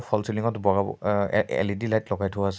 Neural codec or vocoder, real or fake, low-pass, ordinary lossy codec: none; real; none; none